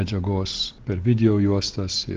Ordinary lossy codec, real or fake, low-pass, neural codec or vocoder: Opus, 16 kbps; real; 7.2 kHz; none